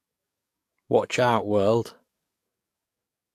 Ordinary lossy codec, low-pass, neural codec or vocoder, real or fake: AAC, 64 kbps; 14.4 kHz; codec, 44.1 kHz, 7.8 kbps, DAC; fake